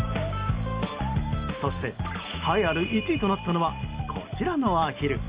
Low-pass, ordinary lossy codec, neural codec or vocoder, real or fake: 3.6 kHz; Opus, 24 kbps; none; real